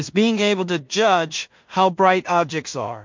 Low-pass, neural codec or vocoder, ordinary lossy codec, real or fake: 7.2 kHz; codec, 16 kHz in and 24 kHz out, 0.4 kbps, LongCat-Audio-Codec, two codebook decoder; MP3, 48 kbps; fake